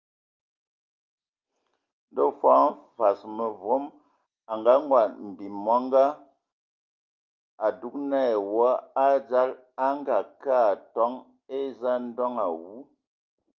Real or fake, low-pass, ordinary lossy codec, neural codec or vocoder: real; 7.2 kHz; Opus, 24 kbps; none